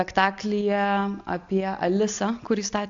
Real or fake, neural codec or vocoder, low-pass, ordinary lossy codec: real; none; 7.2 kHz; AAC, 64 kbps